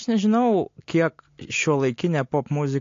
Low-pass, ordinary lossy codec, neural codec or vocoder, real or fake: 7.2 kHz; AAC, 48 kbps; none; real